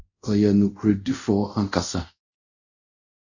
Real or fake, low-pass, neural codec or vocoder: fake; 7.2 kHz; codec, 24 kHz, 0.5 kbps, DualCodec